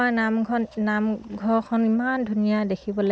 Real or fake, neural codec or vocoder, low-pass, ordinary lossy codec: real; none; none; none